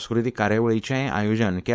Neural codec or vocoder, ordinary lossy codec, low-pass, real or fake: codec, 16 kHz, 4.8 kbps, FACodec; none; none; fake